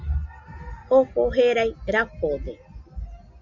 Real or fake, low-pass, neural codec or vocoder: real; 7.2 kHz; none